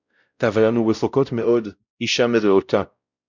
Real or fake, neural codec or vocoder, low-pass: fake; codec, 16 kHz, 0.5 kbps, X-Codec, WavLM features, trained on Multilingual LibriSpeech; 7.2 kHz